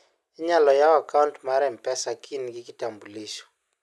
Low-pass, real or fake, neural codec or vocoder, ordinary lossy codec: none; real; none; none